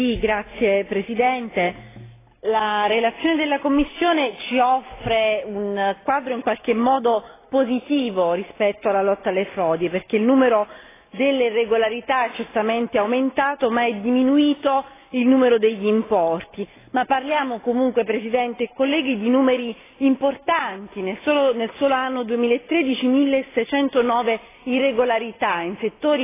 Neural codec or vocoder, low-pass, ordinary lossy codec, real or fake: none; 3.6 kHz; AAC, 16 kbps; real